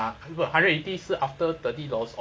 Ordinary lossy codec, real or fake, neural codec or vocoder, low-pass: none; real; none; none